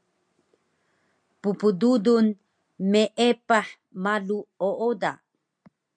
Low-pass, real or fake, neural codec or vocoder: 9.9 kHz; real; none